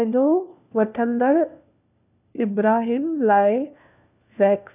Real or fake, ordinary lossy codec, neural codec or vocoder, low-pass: fake; none; codec, 16 kHz, 1 kbps, FunCodec, trained on LibriTTS, 50 frames a second; 3.6 kHz